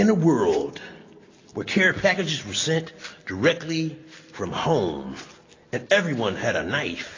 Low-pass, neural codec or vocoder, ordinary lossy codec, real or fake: 7.2 kHz; none; AAC, 32 kbps; real